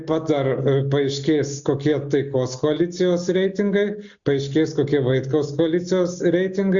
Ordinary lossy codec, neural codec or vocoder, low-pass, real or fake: Opus, 64 kbps; none; 7.2 kHz; real